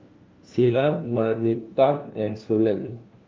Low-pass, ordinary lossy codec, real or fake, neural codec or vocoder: 7.2 kHz; Opus, 32 kbps; fake; codec, 16 kHz, 1 kbps, FunCodec, trained on LibriTTS, 50 frames a second